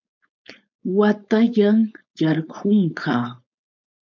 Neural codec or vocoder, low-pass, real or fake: codec, 16 kHz, 4.8 kbps, FACodec; 7.2 kHz; fake